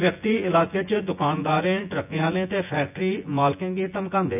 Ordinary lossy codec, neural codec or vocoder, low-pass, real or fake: none; vocoder, 24 kHz, 100 mel bands, Vocos; 3.6 kHz; fake